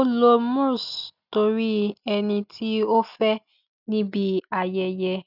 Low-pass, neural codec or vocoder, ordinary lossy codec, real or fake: 5.4 kHz; none; none; real